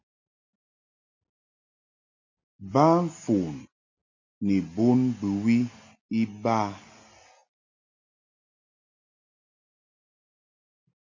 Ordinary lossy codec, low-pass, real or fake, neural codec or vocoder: MP3, 48 kbps; 7.2 kHz; real; none